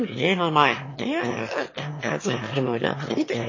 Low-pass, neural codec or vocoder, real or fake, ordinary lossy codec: 7.2 kHz; autoencoder, 22.05 kHz, a latent of 192 numbers a frame, VITS, trained on one speaker; fake; MP3, 32 kbps